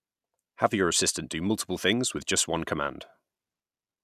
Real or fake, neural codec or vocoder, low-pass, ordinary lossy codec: real; none; 14.4 kHz; none